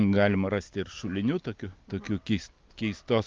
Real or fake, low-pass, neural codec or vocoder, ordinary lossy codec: real; 7.2 kHz; none; Opus, 24 kbps